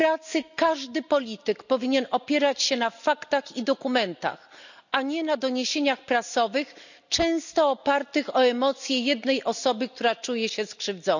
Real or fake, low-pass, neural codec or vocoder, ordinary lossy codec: real; 7.2 kHz; none; none